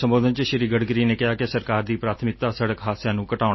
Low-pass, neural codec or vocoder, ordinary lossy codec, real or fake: 7.2 kHz; none; MP3, 24 kbps; real